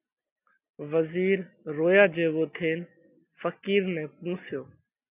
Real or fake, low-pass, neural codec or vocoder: real; 3.6 kHz; none